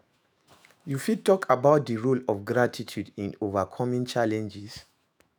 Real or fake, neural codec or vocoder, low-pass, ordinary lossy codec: fake; autoencoder, 48 kHz, 128 numbers a frame, DAC-VAE, trained on Japanese speech; none; none